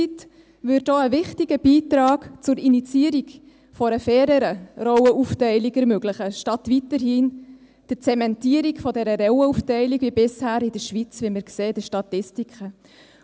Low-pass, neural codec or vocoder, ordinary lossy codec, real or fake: none; none; none; real